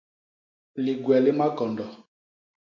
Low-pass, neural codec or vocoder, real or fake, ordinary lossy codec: 7.2 kHz; none; real; MP3, 48 kbps